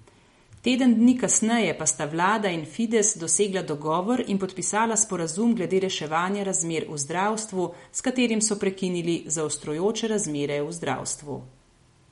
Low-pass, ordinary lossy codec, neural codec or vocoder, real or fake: 19.8 kHz; MP3, 48 kbps; none; real